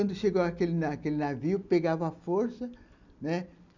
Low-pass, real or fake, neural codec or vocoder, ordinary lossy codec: 7.2 kHz; real; none; none